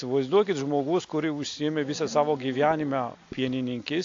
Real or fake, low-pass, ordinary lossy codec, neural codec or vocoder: real; 7.2 kHz; AAC, 48 kbps; none